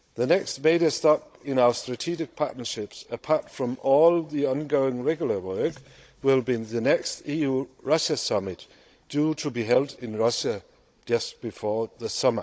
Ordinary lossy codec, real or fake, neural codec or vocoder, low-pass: none; fake; codec, 16 kHz, 16 kbps, FunCodec, trained on Chinese and English, 50 frames a second; none